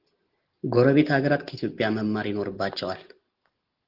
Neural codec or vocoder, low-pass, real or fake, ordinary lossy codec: none; 5.4 kHz; real; Opus, 16 kbps